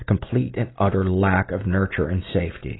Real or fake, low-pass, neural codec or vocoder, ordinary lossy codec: real; 7.2 kHz; none; AAC, 16 kbps